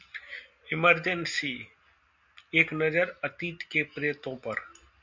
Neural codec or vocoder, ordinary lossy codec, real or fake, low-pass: none; MP3, 64 kbps; real; 7.2 kHz